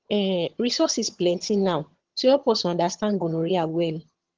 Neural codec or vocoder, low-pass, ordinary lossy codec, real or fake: vocoder, 22.05 kHz, 80 mel bands, HiFi-GAN; 7.2 kHz; Opus, 16 kbps; fake